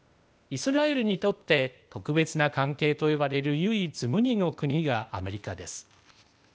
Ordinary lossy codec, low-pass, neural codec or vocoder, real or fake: none; none; codec, 16 kHz, 0.8 kbps, ZipCodec; fake